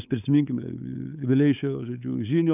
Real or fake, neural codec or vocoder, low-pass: fake; codec, 16 kHz, 8 kbps, FunCodec, trained on LibriTTS, 25 frames a second; 3.6 kHz